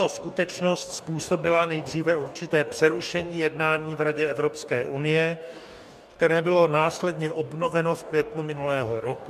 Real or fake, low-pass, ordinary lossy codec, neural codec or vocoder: fake; 14.4 kHz; MP3, 96 kbps; codec, 44.1 kHz, 2.6 kbps, DAC